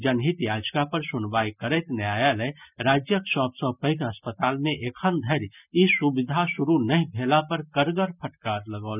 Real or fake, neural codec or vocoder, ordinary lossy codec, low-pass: real; none; none; 3.6 kHz